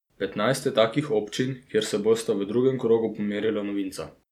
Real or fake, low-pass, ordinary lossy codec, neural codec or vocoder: real; 19.8 kHz; none; none